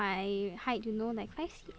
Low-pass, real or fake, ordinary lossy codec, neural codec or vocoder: none; real; none; none